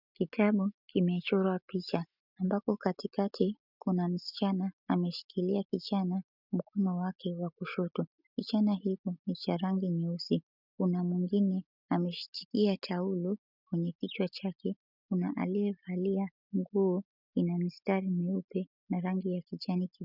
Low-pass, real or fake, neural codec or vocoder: 5.4 kHz; real; none